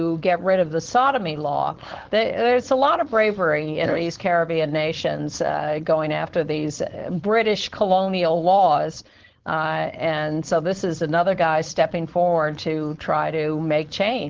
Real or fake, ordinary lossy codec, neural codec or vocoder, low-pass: fake; Opus, 16 kbps; codec, 16 kHz, 4.8 kbps, FACodec; 7.2 kHz